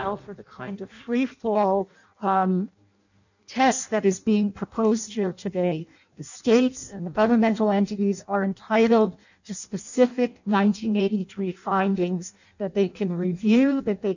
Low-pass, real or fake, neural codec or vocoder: 7.2 kHz; fake; codec, 16 kHz in and 24 kHz out, 0.6 kbps, FireRedTTS-2 codec